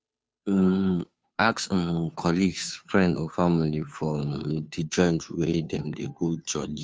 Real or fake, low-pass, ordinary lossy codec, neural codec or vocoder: fake; none; none; codec, 16 kHz, 2 kbps, FunCodec, trained on Chinese and English, 25 frames a second